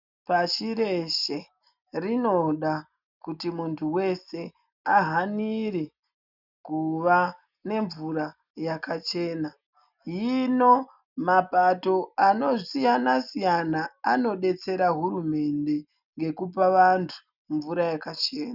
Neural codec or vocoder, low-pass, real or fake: none; 5.4 kHz; real